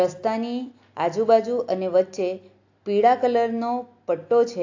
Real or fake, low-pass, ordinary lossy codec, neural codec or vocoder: real; 7.2 kHz; AAC, 48 kbps; none